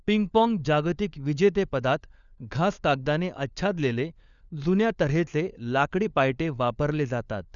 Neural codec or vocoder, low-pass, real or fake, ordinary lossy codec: codec, 16 kHz, 4 kbps, FunCodec, trained on LibriTTS, 50 frames a second; 7.2 kHz; fake; none